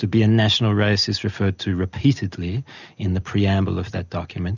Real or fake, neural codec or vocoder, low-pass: real; none; 7.2 kHz